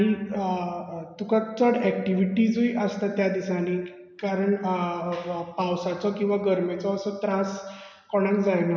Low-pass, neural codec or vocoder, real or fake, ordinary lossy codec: 7.2 kHz; none; real; none